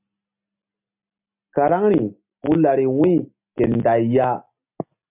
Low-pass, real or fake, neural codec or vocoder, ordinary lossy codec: 3.6 kHz; real; none; MP3, 32 kbps